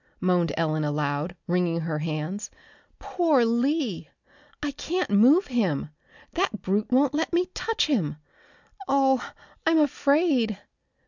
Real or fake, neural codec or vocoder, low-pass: real; none; 7.2 kHz